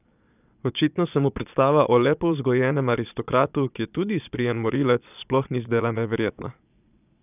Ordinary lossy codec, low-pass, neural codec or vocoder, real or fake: none; 3.6 kHz; vocoder, 22.05 kHz, 80 mel bands, Vocos; fake